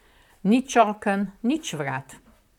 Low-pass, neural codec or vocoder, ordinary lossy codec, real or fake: 19.8 kHz; vocoder, 44.1 kHz, 128 mel bands, Pupu-Vocoder; none; fake